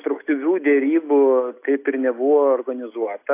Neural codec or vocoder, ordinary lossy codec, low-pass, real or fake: none; AAC, 24 kbps; 3.6 kHz; real